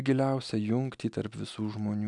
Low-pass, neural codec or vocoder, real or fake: 10.8 kHz; none; real